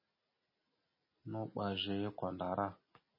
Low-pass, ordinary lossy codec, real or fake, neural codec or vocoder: 5.4 kHz; MP3, 24 kbps; real; none